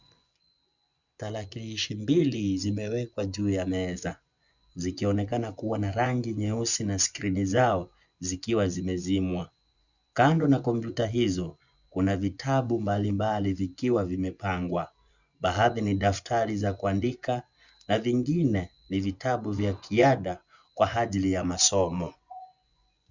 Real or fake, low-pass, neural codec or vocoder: fake; 7.2 kHz; vocoder, 24 kHz, 100 mel bands, Vocos